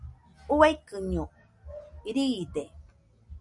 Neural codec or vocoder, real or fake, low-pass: none; real; 10.8 kHz